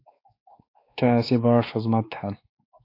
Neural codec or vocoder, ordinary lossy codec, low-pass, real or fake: codec, 16 kHz, 2 kbps, X-Codec, WavLM features, trained on Multilingual LibriSpeech; AAC, 32 kbps; 5.4 kHz; fake